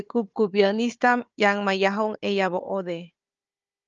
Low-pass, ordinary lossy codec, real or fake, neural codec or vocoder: 7.2 kHz; Opus, 24 kbps; fake; codec, 16 kHz, 4 kbps, FunCodec, trained on Chinese and English, 50 frames a second